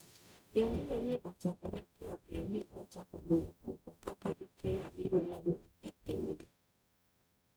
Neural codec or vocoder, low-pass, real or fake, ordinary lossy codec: codec, 44.1 kHz, 0.9 kbps, DAC; none; fake; none